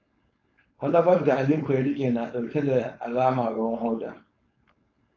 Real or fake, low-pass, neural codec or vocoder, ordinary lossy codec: fake; 7.2 kHz; codec, 16 kHz, 4.8 kbps, FACodec; AAC, 32 kbps